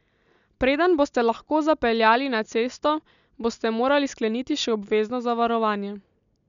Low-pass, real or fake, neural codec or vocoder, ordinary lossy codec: 7.2 kHz; real; none; none